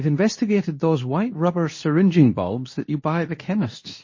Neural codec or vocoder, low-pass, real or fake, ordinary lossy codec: codec, 24 kHz, 0.9 kbps, WavTokenizer, medium speech release version 2; 7.2 kHz; fake; MP3, 32 kbps